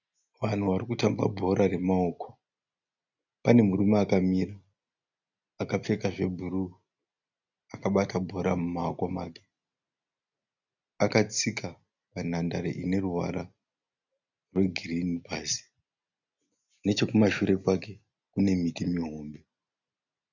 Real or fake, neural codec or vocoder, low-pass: real; none; 7.2 kHz